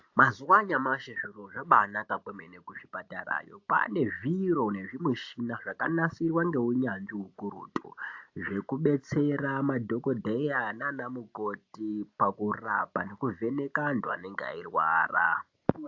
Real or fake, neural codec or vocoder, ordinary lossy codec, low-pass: real; none; AAC, 48 kbps; 7.2 kHz